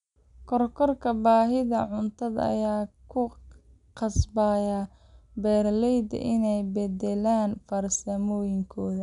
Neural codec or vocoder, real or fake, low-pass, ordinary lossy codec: none; real; 10.8 kHz; none